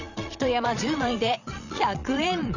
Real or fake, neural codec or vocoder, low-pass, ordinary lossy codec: fake; vocoder, 22.05 kHz, 80 mel bands, Vocos; 7.2 kHz; none